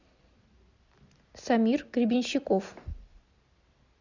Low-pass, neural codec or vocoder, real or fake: 7.2 kHz; none; real